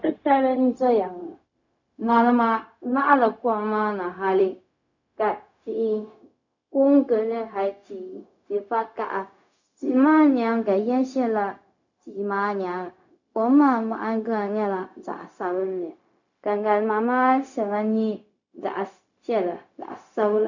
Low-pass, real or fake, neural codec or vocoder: 7.2 kHz; fake; codec, 16 kHz, 0.4 kbps, LongCat-Audio-Codec